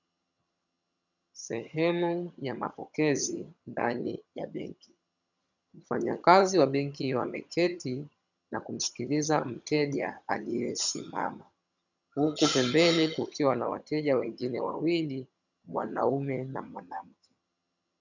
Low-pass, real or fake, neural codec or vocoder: 7.2 kHz; fake; vocoder, 22.05 kHz, 80 mel bands, HiFi-GAN